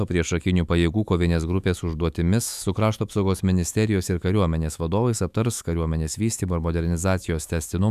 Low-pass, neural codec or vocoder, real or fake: 14.4 kHz; autoencoder, 48 kHz, 128 numbers a frame, DAC-VAE, trained on Japanese speech; fake